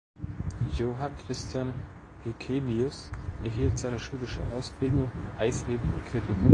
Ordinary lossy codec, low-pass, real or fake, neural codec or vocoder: AAC, 32 kbps; 10.8 kHz; fake; codec, 24 kHz, 0.9 kbps, WavTokenizer, medium speech release version 2